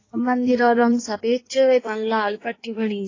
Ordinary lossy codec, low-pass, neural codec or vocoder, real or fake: AAC, 32 kbps; 7.2 kHz; codec, 16 kHz in and 24 kHz out, 1.1 kbps, FireRedTTS-2 codec; fake